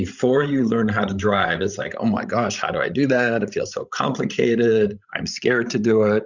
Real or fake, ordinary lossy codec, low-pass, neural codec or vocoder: fake; Opus, 64 kbps; 7.2 kHz; codec, 16 kHz, 16 kbps, FreqCodec, larger model